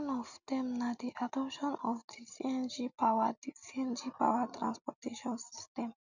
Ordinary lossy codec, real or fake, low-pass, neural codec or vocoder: none; real; 7.2 kHz; none